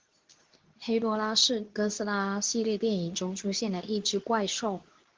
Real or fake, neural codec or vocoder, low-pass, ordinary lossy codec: fake; codec, 24 kHz, 0.9 kbps, WavTokenizer, medium speech release version 2; 7.2 kHz; Opus, 16 kbps